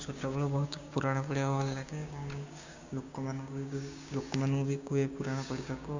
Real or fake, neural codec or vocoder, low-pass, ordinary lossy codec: fake; codec, 16 kHz, 6 kbps, DAC; 7.2 kHz; Opus, 64 kbps